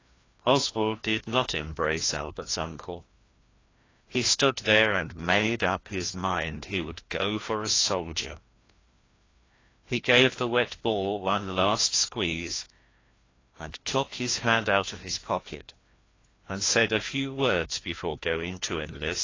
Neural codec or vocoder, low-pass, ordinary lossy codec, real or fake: codec, 16 kHz, 1 kbps, FreqCodec, larger model; 7.2 kHz; AAC, 32 kbps; fake